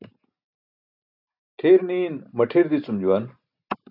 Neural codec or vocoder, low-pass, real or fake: none; 5.4 kHz; real